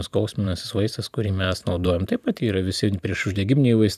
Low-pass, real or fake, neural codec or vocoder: 14.4 kHz; fake; vocoder, 44.1 kHz, 128 mel bands every 512 samples, BigVGAN v2